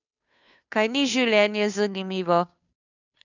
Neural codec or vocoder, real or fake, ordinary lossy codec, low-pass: codec, 16 kHz, 2 kbps, FunCodec, trained on Chinese and English, 25 frames a second; fake; none; 7.2 kHz